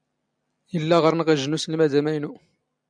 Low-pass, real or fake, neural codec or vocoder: 9.9 kHz; real; none